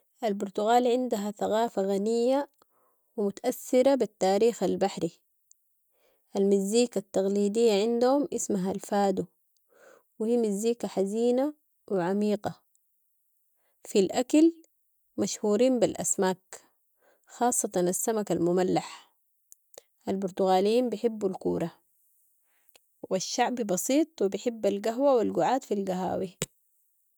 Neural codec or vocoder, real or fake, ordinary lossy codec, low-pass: none; real; none; none